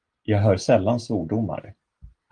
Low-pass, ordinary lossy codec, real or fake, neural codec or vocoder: 9.9 kHz; Opus, 24 kbps; real; none